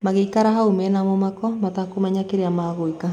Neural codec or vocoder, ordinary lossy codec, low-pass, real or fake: none; none; 19.8 kHz; real